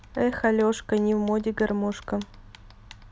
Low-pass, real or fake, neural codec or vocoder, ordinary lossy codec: none; real; none; none